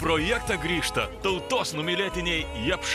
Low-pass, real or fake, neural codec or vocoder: 14.4 kHz; real; none